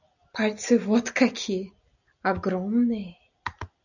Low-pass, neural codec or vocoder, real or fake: 7.2 kHz; none; real